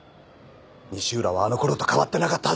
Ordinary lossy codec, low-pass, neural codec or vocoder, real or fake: none; none; none; real